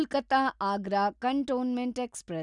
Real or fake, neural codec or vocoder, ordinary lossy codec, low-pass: real; none; none; 10.8 kHz